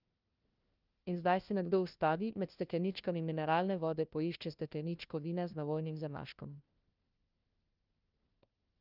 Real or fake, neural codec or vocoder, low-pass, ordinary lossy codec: fake; codec, 16 kHz, 1 kbps, FunCodec, trained on LibriTTS, 50 frames a second; 5.4 kHz; Opus, 32 kbps